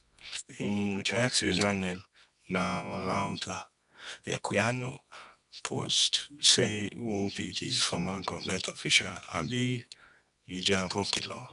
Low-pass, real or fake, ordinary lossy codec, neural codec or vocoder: 10.8 kHz; fake; none; codec, 24 kHz, 0.9 kbps, WavTokenizer, medium music audio release